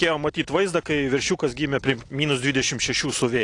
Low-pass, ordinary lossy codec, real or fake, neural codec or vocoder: 10.8 kHz; AAC, 48 kbps; real; none